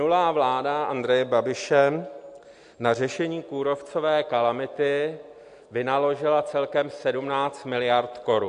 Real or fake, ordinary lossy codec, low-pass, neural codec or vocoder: real; AAC, 64 kbps; 9.9 kHz; none